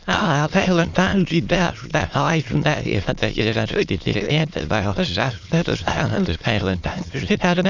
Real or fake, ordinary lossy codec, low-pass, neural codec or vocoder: fake; Opus, 64 kbps; 7.2 kHz; autoencoder, 22.05 kHz, a latent of 192 numbers a frame, VITS, trained on many speakers